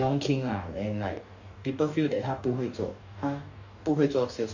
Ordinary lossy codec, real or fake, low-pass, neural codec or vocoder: none; fake; 7.2 kHz; codec, 44.1 kHz, 2.6 kbps, DAC